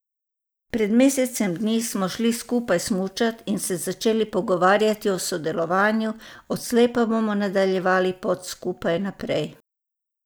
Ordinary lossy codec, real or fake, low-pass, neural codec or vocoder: none; real; none; none